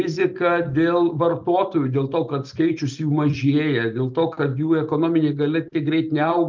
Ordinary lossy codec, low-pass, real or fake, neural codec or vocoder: Opus, 24 kbps; 7.2 kHz; real; none